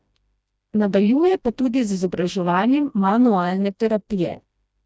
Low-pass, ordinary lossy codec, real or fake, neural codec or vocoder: none; none; fake; codec, 16 kHz, 1 kbps, FreqCodec, smaller model